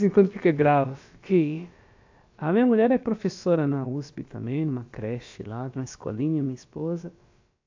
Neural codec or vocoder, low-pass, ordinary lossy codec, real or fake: codec, 16 kHz, about 1 kbps, DyCAST, with the encoder's durations; 7.2 kHz; AAC, 48 kbps; fake